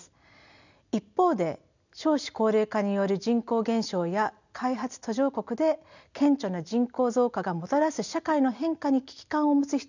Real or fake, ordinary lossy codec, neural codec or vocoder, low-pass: real; none; none; 7.2 kHz